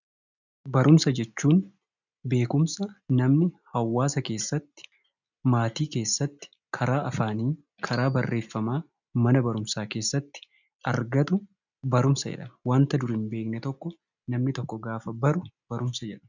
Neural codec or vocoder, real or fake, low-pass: none; real; 7.2 kHz